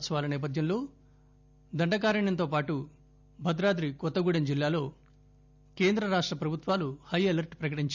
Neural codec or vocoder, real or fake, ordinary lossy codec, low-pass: none; real; none; 7.2 kHz